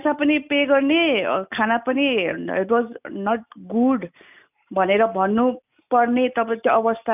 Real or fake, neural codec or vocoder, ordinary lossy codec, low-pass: real; none; none; 3.6 kHz